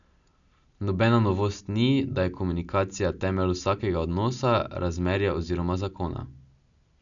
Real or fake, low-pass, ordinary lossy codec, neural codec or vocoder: real; 7.2 kHz; none; none